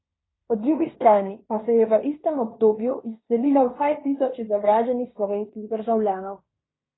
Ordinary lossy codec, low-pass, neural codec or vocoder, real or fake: AAC, 16 kbps; 7.2 kHz; codec, 16 kHz in and 24 kHz out, 0.9 kbps, LongCat-Audio-Codec, fine tuned four codebook decoder; fake